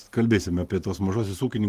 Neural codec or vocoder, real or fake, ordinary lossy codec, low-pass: none; real; Opus, 24 kbps; 14.4 kHz